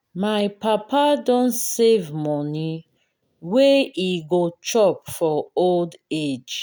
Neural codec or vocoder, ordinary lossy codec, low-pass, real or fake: none; none; none; real